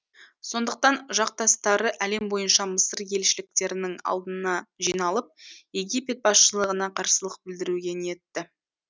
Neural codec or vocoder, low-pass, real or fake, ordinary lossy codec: none; none; real; none